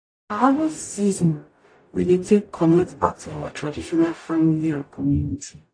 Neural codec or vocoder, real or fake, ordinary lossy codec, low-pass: codec, 44.1 kHz, 0.9 kbps, DAC; fake; AAC, 48 kbps; 9.9 kHz